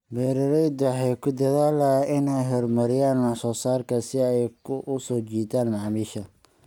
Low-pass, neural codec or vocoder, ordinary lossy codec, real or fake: 19.8 kHz; none; none; real